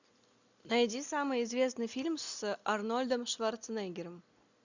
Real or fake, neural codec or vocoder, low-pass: real; none; 7.2 kHz